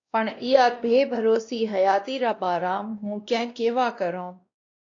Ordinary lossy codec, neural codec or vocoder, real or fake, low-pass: AAC, 48 kbps; codec, 16 kHz, 1 kbps, X-Codec, WavLM features, trained on Multilingual LibriSpeech; fake; 7.2 kHz